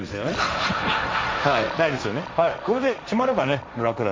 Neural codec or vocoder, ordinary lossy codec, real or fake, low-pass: codec, 16 kHz, 1.1 kbps, Voila-Tokenizer; none; fake; none